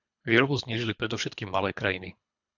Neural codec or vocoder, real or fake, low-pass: codec, 24 kHz, 3 kbps, HILCodec; fake; 7.2 kHz